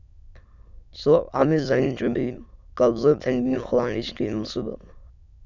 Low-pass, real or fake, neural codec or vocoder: 7.2 kHz; fake; autoencoder, 22.05 kHz, a latent of 192 numbers a frame, VITS, trained on many speakers